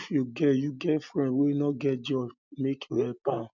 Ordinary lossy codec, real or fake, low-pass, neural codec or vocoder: none; real; 7.2 kHz; none